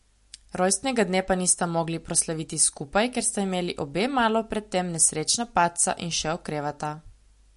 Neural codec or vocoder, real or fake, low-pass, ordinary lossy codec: none; real; 14.4 kHz; MP3, 48 kbps